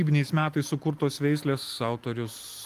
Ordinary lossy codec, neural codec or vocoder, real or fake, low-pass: Opus, 32 kbps; none; real; 14.4 kHz